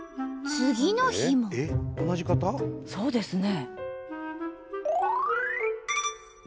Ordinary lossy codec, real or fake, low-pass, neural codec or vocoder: none; real; none; none